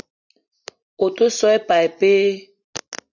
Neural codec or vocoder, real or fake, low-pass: none; real; 7.2 kHz